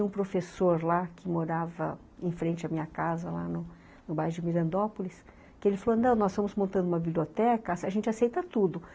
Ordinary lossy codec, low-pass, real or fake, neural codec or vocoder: none; none; real; none